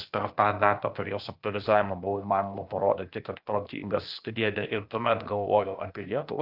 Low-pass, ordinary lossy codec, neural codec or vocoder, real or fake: 5.4 kHz; Opus, 32 kbps; codec, 16 kHz, 0.8 kbps, ZipCodec; fake